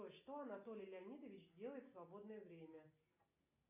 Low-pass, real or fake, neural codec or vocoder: 3.6 kHz; real; none